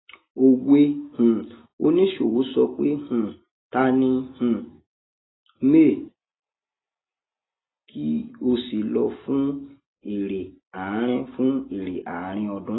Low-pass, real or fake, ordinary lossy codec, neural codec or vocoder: 7.2 kHz; real; AAC, 16 kbps; none